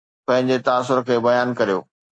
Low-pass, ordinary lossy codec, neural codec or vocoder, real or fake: 9.9 kHz; AAC, 48 kbps; none; real